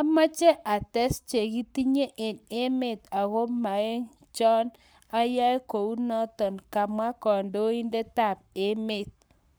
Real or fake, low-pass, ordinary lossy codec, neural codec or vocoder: fake; none; none; codec, 44.1 kHz, 7.8 kbps, Pupu-Codec